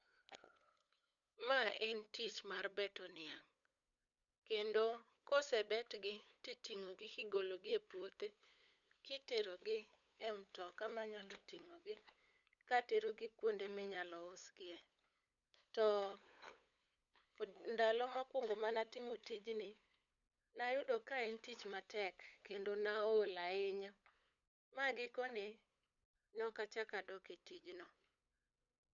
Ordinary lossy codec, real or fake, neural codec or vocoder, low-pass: none; fake; codec, 16 kHz, 8 kbps, FunCodec, trained on LibriTTS, 25 frames a second; 7.2 kHz